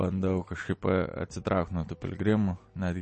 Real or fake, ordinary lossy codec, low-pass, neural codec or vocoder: real; MP3, 32 kbps; 9.9 kHz; none